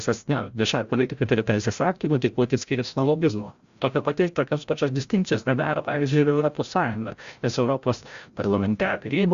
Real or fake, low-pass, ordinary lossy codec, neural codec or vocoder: fake; 7.2 kHz; Opus, 64 kbps; codec, 16 kHz, 0.5 kbps, FreqCodec, larger model